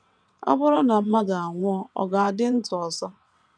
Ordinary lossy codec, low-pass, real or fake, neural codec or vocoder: none; 9.9 kHz; fake; vocoder, 22.05 kHz, 80 mel bands, WaveNeXt